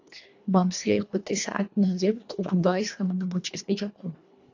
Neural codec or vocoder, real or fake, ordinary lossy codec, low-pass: codec, 24 kHz, 1.5 kbps, HILCodec; fake; AAC, 48 kbps; 7.2 kHz